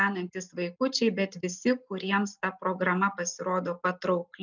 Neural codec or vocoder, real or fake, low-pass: none; real; 7.2 kHz